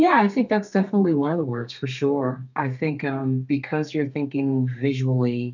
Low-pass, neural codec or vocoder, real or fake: 7.2 kHz; codec, 32 kHz, 1.9 kbps, SNAC; fake